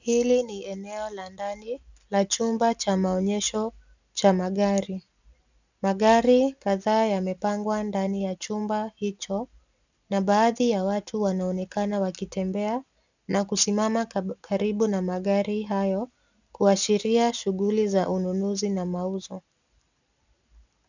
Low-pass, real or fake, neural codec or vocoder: 7.2 kHz; real; none